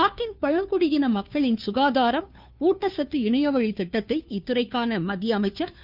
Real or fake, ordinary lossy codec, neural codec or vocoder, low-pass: fake; none; codec, 16 kHz, 2 kbps, FunCodec, trained on Chinese and English, 25 frames a second; 5.4 kHz